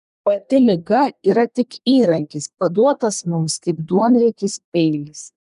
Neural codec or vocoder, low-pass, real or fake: codec, 24 kHz, 1 kbps, SNAC; 10.8 kHz; fake